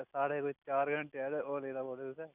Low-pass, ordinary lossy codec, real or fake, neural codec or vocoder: 3.6 kHz; none; real; none